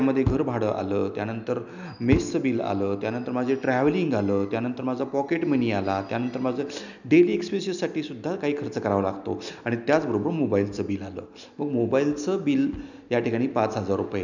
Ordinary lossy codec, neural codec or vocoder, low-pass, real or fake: none; none; 7.2 kHz; real